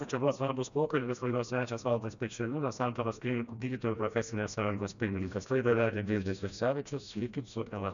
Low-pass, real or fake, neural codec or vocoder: 7.2 kHz; fake; codec, 16 kHz, 1 kbps, FreqCodec, smaller model